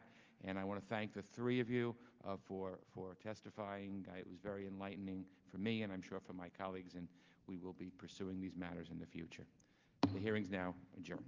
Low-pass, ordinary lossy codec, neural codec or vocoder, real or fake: 7.2 kHz; Opus, 32 kbps; none; real